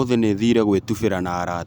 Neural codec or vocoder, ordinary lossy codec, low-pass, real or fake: none; none; none; real